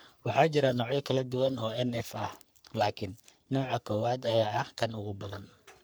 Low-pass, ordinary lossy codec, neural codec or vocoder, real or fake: none; none; codec, 44.1 kHz, 3.4 kbps, Pupu-Codec; fake